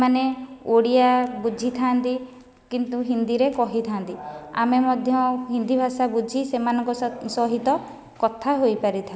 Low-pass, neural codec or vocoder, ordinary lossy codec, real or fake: none; none; none; real